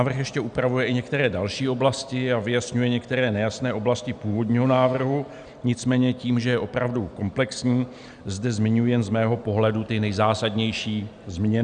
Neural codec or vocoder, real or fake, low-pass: none; real; 9.9 kHz